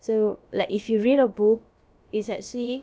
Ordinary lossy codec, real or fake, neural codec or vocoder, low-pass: none; fake; codec, 16 kHz, about 1 kbps, DyCAST, with the encoder's durations; none